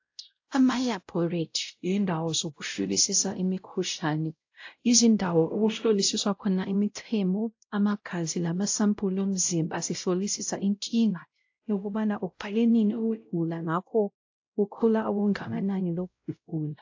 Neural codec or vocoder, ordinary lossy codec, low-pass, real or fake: codec, 16 kHz, 0.5 kbps, X-Codec, WavLM features, trained on Multilingual LibriSpeech; AAC, 48 kbps; 7.2 kHz; fake